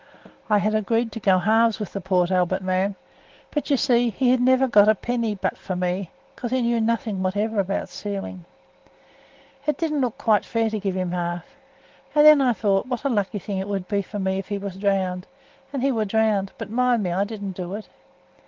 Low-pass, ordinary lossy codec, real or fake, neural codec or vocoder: 7.2 kHz; Opus, 16 kbps; real; none